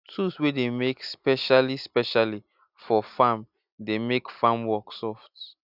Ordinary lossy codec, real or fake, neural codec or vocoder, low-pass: none; real; none; 5.4 kHz